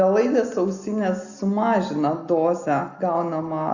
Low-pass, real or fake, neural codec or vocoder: 7.2 kHz; real; none